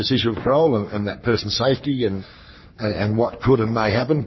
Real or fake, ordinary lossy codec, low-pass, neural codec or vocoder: fake; MP3, 24 kbps; 7.2 kHz; codec, 44.1 kHz, 3.4 kbps, Pupu-Codec